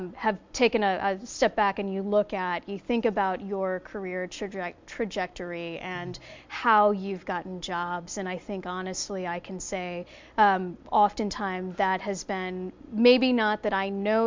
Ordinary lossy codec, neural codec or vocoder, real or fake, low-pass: MP3, 64 kbps; none; real; 7.2 kHz